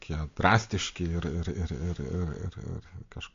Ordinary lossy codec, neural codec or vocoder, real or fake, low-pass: AAC, 48 kbps; none; real; 7.2 kHz